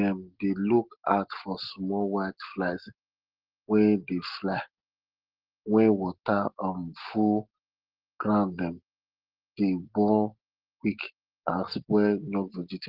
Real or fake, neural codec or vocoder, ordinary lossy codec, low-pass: real; none; Opus, 16 kbps; 5.4 kHz